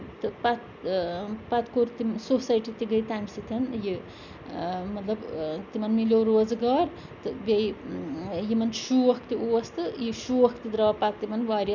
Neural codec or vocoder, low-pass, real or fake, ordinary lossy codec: none; 7.2 kHz; real; none